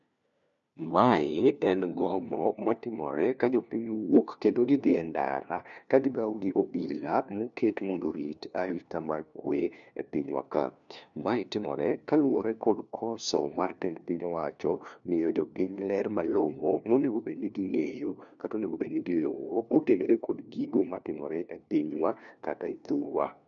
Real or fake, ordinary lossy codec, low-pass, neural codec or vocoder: fake; none; 7.2 kHz; codec, 16 kHz, 1 kbps, FunCodec, trained on LibriTTS, 50 frames a second